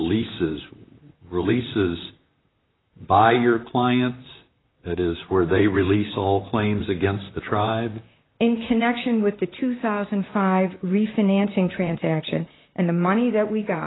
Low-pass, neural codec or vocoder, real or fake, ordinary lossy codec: 7.2 kHz; vocoder, 44.1 kHz, 128 mel bands, Pupu-Vocoder; fake; AAC, 16 kbps